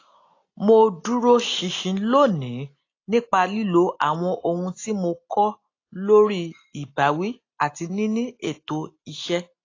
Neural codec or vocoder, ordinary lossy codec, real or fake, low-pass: none; AAC, 32 kbps; real; 7.2 kHz